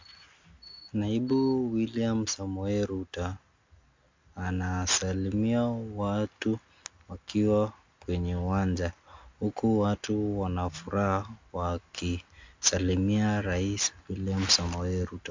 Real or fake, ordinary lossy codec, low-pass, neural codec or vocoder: real; MP3, 64 kbps; 7.2 kHz; none